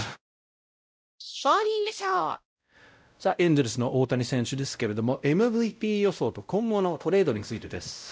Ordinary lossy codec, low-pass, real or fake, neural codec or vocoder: none; none; fake; codec, 16 kHz, 0.5 kbps, X-Codec, WavLM features, trained on Multilingual LibriSpeech